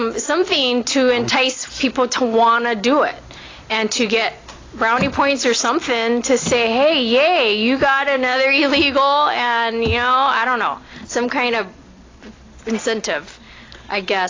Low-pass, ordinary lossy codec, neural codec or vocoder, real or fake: 7.2 kHz; AAC, 32 kbps; none; real